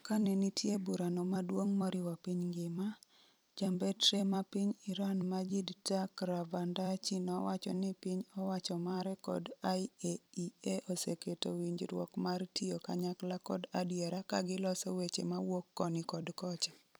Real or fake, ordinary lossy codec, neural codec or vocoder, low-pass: fake; none; vocoder, 44.1 kHz, 128 mel bands every 256 samples, BigVGAN v2; none